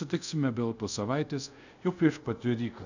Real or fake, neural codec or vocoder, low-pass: fake; codec, 24 kHz, 0.5 kbps, DualCodec; 7.2 kHz